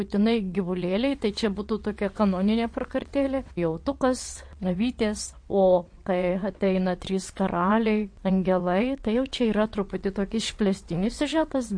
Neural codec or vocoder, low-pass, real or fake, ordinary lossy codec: vocoder, 22.05 kHz, 80 mel bands, WaveNeXt; 9.9 kHz; fake; MP3, 48 kbps